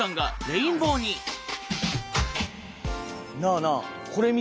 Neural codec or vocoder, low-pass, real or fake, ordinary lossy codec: none; none; real; none